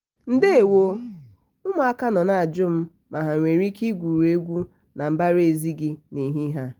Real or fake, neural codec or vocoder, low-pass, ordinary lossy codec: real; none; 19.8 kHz; Opus, 24 kbps